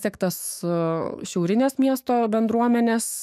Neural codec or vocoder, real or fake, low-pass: autoencoder, 48 kHz, 128 numbers a frame, DAC-VAE, trained on Japanese speech; fake; 14.4 kHz